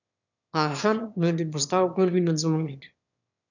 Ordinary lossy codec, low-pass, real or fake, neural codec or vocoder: none; 7.2 kHz; fake; autoencoder, 22.05 kHz, a latent of 192 numbers a frame, VITS, trained on one speaker